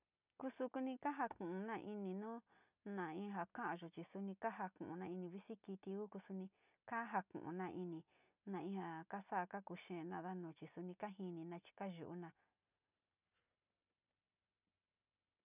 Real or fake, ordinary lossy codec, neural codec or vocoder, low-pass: real; none; none; 3.6 kHz